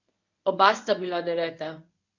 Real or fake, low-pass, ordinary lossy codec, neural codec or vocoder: fake; 7.2 kHz; none; codec, 24 kHz, 0.9 kbps, WavTokenizer, medium speech release version 1